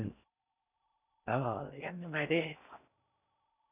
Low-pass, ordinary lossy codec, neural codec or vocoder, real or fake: 3.6 kHz; none; codec, 16 kHz in and 24 kHz out, 0.6 kbps, FocalCodec, streaming, 4096 codes; fake